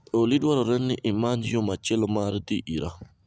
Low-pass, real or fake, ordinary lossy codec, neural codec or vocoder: none; real; none; none